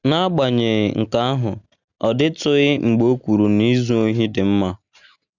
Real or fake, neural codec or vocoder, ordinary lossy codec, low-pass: real; none; none; 7.2 kHz